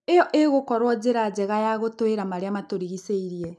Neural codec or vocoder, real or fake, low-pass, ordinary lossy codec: none; real; none; none